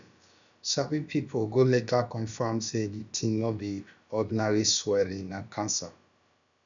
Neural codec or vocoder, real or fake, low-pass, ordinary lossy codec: codec, 16 kHz, about 1 kbps, DyCAST, with the encoder's durations; fake; 7.2 kHz; none